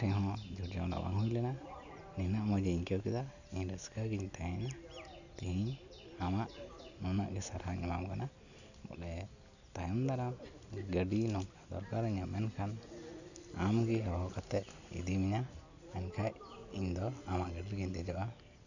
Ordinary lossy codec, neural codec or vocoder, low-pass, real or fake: none; none; 7.2 kHz; real